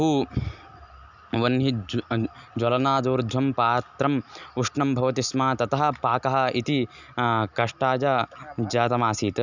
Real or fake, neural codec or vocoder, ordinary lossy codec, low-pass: real; none; none; 7.2 kHz